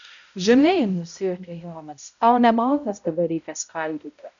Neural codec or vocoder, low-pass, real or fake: codec, 16 kHz, 0.5 kbps, X-Codec, HuBERT features, trained on balanced general audio; 7.2 kHz; fake